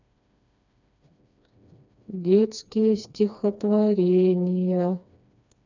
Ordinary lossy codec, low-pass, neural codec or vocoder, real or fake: none; 7.2 kHz; codec, 16 kHz, 2 kbps, FreqCodec, smaller model; fake